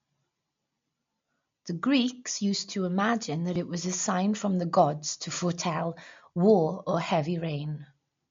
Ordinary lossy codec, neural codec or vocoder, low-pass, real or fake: MP3, 48 kbps; none; 7.2 kHz; real